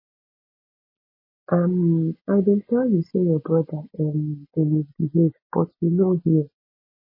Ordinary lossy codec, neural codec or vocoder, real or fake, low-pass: MP3, 24 kbps; none; real; 5.4 kHz